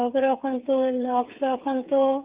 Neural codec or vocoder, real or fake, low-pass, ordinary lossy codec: codec, 16 kHz, 8 kbps, FreqCodec, smaller model; fake; 3.6 kHz; Opus, 32 kbps